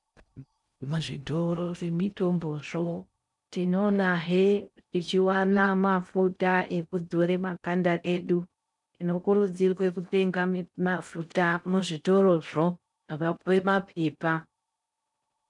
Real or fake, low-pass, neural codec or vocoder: fake; 10.8 kHz; codec, 16 kHz in and 24 kHz out, 0.6 kbps, FocalCodec, streaming, 4096 codes